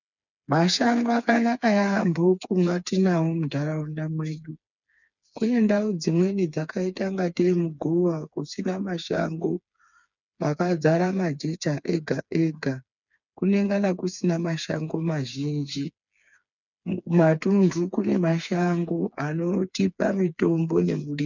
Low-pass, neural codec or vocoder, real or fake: 7.2 kHz; codec, 16 kHz, 4 kbps, FreqCodec, smaller model; fake